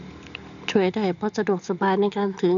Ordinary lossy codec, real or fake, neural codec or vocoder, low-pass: none; real; none; 7.2 kHz